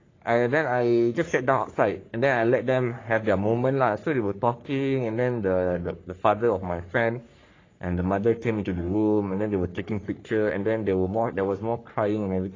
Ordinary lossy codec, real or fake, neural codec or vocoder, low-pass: AAC, 32 kbps; fake; codec, 44.1 kHz, 3.4 kbps, Pupu-Codec; 7.2 kHz